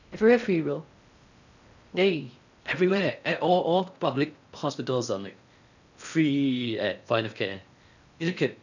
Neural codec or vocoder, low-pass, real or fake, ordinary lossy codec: codec, 16 kHz in and 24 kHz out, 0.6 kbps, FocalCodec, streaming, 4096 codes; 7.2 kHz; fake; none